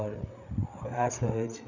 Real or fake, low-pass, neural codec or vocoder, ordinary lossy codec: fake; 7.2 kHz; codec, 16 kHz, 8 kbps, FreqCodec, larger model; none